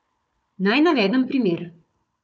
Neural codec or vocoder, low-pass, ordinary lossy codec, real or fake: codec, 16 kHz, 4 kbps, FunCodec, trained on Chinese and English, 50 frames a second; none; none; fake